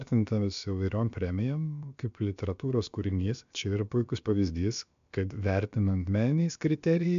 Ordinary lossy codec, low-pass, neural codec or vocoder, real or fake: MP3, 64 kbps; 7.2 kHz; codec, 16 kHz, about 1 kbps, DyCAST, with the encoder's durations; fake